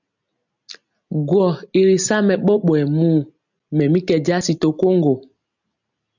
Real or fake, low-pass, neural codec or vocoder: real; 7.2 kHz; none